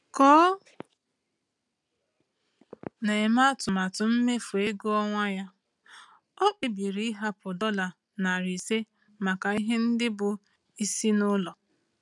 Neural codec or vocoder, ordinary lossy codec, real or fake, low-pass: none; none; real; 10.8 kHz